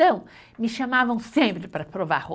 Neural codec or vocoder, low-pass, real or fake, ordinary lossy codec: none; none; real; none